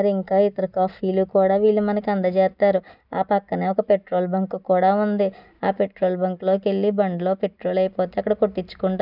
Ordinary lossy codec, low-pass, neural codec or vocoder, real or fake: none; 5.4 kHz; none; real